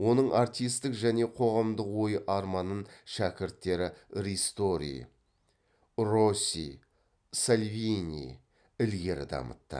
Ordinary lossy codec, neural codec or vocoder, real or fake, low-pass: none; none; real; 9.9 kHz